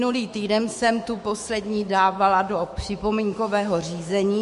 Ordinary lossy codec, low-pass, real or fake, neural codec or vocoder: MP3, 48 kbps; 14.4 kHz; fake; autoencoder, 48 kHz, 128 numbers a frame, DAC-VAE, trained on Japanese speech